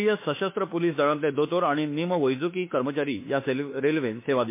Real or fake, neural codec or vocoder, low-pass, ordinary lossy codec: fake; autoencoder, 48 kHz, 32 numbers a frame, DAC-VAE, trained on Japanese speech; 3.6 kHz; MP3, 24 kbps